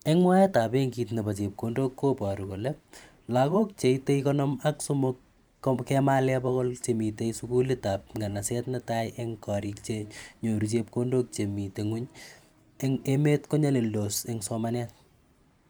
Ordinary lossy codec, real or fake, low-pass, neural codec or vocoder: none; fake; none; vocoder, 44.1 kHz, 128 mel bands every 512 samples, BigVGAN v2